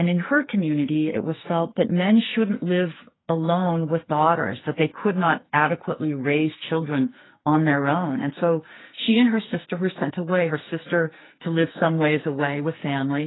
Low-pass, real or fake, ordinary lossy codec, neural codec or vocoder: 7.2 kHz; fake; AAC, 16 kbps; codec, 44.1 kHz, 2.6 kbps, SNAC